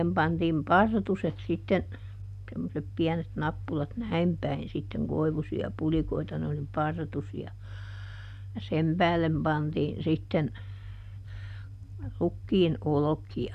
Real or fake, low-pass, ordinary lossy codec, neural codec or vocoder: real; 14.4 kHz; none; none